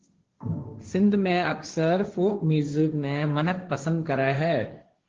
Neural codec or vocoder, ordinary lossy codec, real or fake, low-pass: codec, 16 kHz, 1.1 kbps, Voila-Tokenizer; Opus, 16 kbps; fake; 7.2 kHz